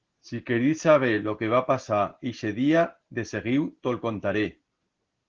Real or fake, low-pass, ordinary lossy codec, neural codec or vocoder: real; 7.2 kHz; Opus, 16 kbps; none